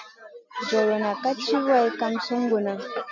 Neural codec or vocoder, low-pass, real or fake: none; 7.2 kHz; real